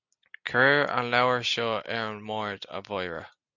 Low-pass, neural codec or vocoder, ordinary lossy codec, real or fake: 7.2 kHz; none; Opus, 64 kbps; real